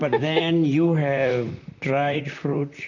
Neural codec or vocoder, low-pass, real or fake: vocoder, 44.1 kHz, 128 mel bands, Pupu-Vocoder; 7.2 kHz; fake